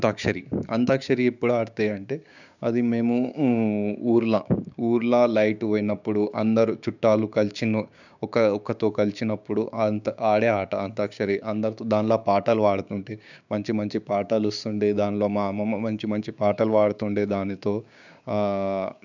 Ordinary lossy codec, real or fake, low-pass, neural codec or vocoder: none; fake; 7.2 kHz; codec, 16 kHz, 6 kbps, DAC